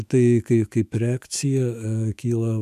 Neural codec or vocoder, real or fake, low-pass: autoencoder, 48 kHz, 128 numbers a frame, DAC-VAE, trained on Japanese speech; fake; 14.4 kHz